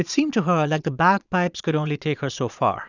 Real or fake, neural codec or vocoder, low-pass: fake; vocoder, 22.05 kHz, 80 mel bands, Vocos; 7.2 kHz